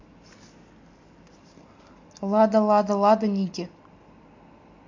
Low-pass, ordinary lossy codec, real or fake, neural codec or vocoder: 7.2 kHz; MP3, 48 kbps; real; none